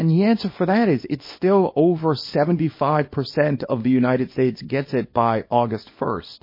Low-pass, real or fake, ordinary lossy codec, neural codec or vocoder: 5.4 kHz; fake; MP3, 24 kbps; codec, 24 kHz, 0.9 kbps, WavTokenizer, small release